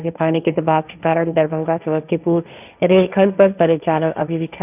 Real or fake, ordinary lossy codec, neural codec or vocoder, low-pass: fake; none; codec, 16 kHz, 1.1 kbps, Voila-Tokenizer; 3.6 kHz